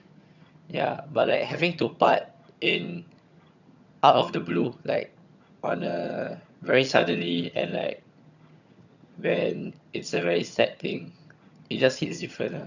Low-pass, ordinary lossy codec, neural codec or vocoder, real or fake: 7.2 kHz; none; vocoder, 22.05 kHz, 80 mel bands, HiFi-GAN; fake